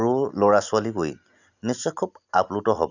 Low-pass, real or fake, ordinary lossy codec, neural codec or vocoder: 7.2 kHz; real; none; none